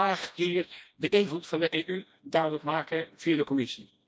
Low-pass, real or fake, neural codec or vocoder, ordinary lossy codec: none; fake; codec, 16 kHz, 1 kbps, FreqCodec, smaller model; none